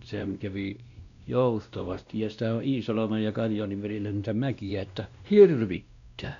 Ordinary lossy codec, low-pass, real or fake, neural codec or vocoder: none; 7.2 kHz; fake; codec, 16 kHz, 1 kbps, X-Codec, WavLM features, trained on Multilingual LibriSpeech